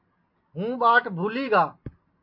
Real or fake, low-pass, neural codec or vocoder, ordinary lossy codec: real; 5.4 kHz; none; MP3, 32 kbps